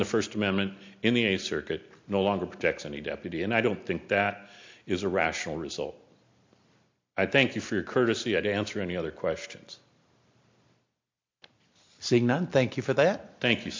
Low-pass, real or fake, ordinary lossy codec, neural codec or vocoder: 7.2 kHz; real; MP3, 48 kbps; none